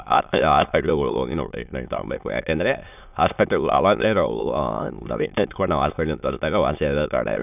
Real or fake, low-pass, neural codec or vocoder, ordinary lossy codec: fake; 3.6 kHz; autoencoder, 22.05 kHz, a latent of 192 numbers a frame, VITS, trained on many speakers; none